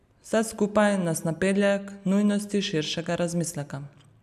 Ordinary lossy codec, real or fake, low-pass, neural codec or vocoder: none; real; 14.4 kHz; none